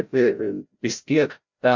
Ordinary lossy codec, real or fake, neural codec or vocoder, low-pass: Opus, 64 kbps; fake; codec, 16 kHz, 0.5 kbps, FreqCodec, larger model; 7.2 kHz